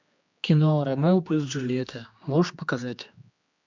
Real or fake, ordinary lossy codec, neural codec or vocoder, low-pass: fake; MP3, 64 kbps; codec, 16 kHz, 2 kbps, X-Codec, HuBERT features, trained on general audio; 7.2 kHz